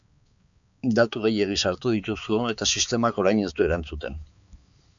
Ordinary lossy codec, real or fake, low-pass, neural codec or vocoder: MP3, 64 kbps; fake; 7.2 kHz; codec, 16 kHz, 4 kbps, X-Codec, HuBERT features, trained on balanced general audio